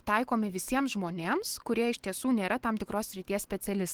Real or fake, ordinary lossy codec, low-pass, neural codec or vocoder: fake; Opus, 16 kbps; 19.8 kHz; autoencoder, 48 kHz, 128 numbers a frame, DAC-VAE, trained on Japanese speech